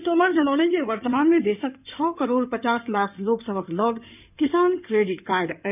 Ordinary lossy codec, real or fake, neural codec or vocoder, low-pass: none; fake; codec, 16 kHz in and 24 kHz out, 2.2 kbps, FireRedTTS-2 codec; 3.6 kHz